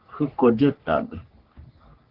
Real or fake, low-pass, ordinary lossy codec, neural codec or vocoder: fake; 5.4 kHz; Opus, 16 kbps; codec, 44.1 kHz, 3.4 kbps, Pupu-Codec